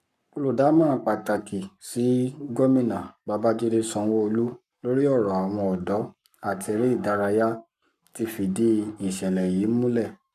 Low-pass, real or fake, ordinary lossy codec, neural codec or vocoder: 14.4 kHz; fake; none; codec, 44.1 kHz, 7.8 kbps, Pupu-Codec